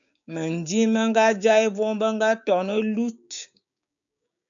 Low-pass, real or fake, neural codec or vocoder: 7.2 kHz; fake; codec, 16 kHz, 6 kbps, DAC